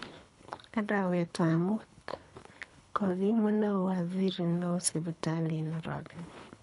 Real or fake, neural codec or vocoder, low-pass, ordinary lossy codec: fake; codec, 24 kHz, 3 kbps, HILCodec; 10.8 kHz; MP3, 96 kbps